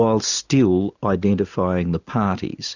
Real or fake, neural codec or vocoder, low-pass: real; none; 7.2 kHz